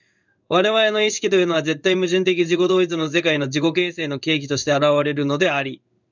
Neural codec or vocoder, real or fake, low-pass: codec, 16 kHz in and 24 kHz out, 1 kbps, XY-Tokenizer; fake; 7.2 kHz